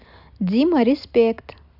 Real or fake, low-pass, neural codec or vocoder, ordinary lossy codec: real; 5.4 kHz; none; AAC, 48 kbps